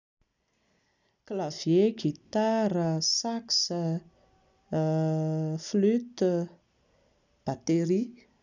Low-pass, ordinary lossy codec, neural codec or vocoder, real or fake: 7.2 kHz; none; none; real